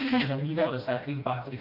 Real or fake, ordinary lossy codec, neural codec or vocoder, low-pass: fake; none; codec, 16 kHz, 1 kbps, FreqCodec, smaller model; 5.4 kHz